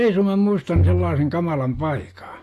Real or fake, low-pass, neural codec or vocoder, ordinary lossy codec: real; 14.4 kHz; none; AAC, 48 kbps